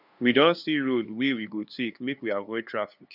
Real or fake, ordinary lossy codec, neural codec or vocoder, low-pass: fake; none; codec, 16 kHz, 2 kbps, FunCodec, trained on LibriTTS, 25 frames a second; 5.4 kHz